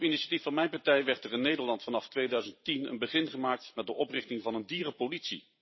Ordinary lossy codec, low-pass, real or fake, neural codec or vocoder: MP3, 24 kbps; 7.2 kHz; fake; vocoder, 44.1 kHz, 128 mel bands every 512 samples, BigVGAN v2